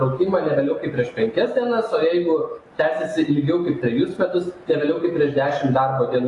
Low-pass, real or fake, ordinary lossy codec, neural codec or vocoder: 10.8 kHz; real; AAC, 32 kbps; none